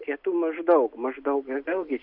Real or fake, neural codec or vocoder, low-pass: real; none; 5.4 kHz